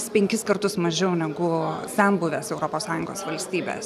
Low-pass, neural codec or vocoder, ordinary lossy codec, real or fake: 14.4 kHz; none; AAC, 96 kbps; real